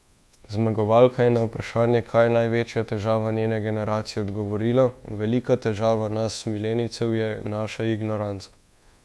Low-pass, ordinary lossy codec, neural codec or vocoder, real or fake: none; none; codec, 24 kHz, 1.2 kbps, DualCodec; fake